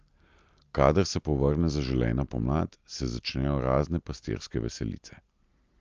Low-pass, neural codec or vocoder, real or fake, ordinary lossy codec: 7.2 kHz; none; real; Opus, 24 kbps